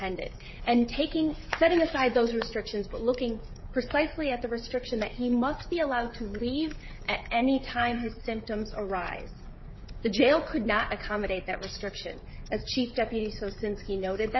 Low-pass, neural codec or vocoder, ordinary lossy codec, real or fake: 7.2 kHz; codec, 16 kHz, 16 kbps, FreqCodec, smaller model; MP3, 24 kbps; fake